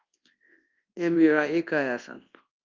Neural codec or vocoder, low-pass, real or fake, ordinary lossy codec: codec, 24 kHz, 0.9 kbps, WavTokenizer, large speech release; 7.2 kHz; fake; Opus, 24 kbps